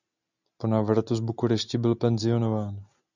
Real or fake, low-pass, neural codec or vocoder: real; 7.2 kHz; none